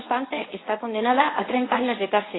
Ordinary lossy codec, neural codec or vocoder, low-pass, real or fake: AAC, 16 kbps; codec, 24 kHz, 0.9 kbps, WavTokenizer, medium speech release version 1; 7.2 kHz; fake